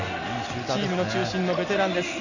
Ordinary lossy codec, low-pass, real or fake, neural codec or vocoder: none; 7.2 kHz; real; none